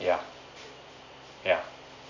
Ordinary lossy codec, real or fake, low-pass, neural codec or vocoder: none; real; 7.2 kHz; none